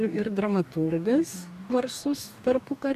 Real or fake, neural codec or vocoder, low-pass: fake; codec, 44.1 kHz, 2.6 kbps, DAC; 14.4 kHz